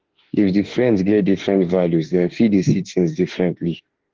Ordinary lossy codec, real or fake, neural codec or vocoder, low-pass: Opus, 16 kbps; fake; autoencoder, 48 kHz, 32 numbers a frame, DAC-VAE, trained on Japanese speech; 7.2 kHz